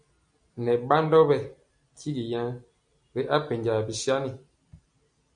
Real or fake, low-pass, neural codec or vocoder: real; 9.9 kHz; none